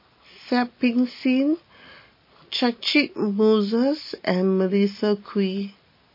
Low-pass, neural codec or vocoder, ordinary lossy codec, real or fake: 5.4 kHz; none; MP3, 24 kbps; real